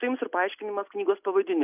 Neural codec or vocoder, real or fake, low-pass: none; real; 3.6 kHz